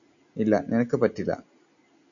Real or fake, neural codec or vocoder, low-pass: real; none; 7.2 kHz